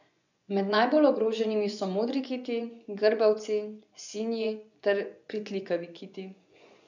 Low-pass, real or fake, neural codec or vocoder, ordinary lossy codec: 7.2 kHz; fake; vocoder, 24 kHz, 100 mel bands, Vocos; none